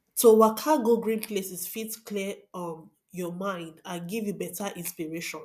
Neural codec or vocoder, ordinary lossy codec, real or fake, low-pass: none; MP3, 96 kbps; real; 14.4 kHz